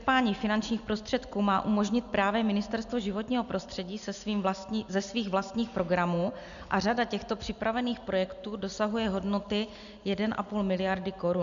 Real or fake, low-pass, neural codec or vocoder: real; 7.2 kHz; none